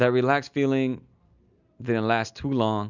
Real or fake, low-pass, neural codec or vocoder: real; 7.2 kHz; none